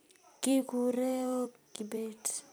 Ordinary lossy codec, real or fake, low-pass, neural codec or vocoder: none; fake; none; vocoder, 44.1 kHz, 128 mel bands every 256 samples, BigVGAN v2